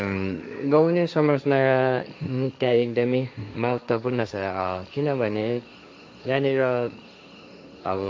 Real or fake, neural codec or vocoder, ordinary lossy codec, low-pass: fake; codec, 16 kHz, 1.1 kbps, Voila-Tokenizer; none; none